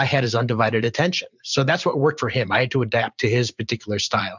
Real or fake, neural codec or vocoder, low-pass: fake; vocoder, 44.1 kHz, 128 mel bands every 512 samples, BigVGAN v2; 7.2 kHz